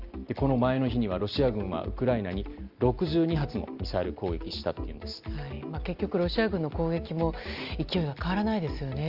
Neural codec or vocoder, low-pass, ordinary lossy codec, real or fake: none; 5.4 kHz; Opus, 24 kbps; real